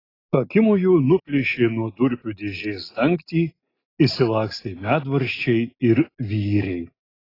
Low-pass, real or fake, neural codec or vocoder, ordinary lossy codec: 5.4 kHz; real; none; AAC, 24 kbps